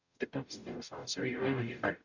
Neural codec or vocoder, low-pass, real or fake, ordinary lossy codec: codec, 44.1 kHz, 0.9 kbps, DAC; 7.2 kHz; fake; none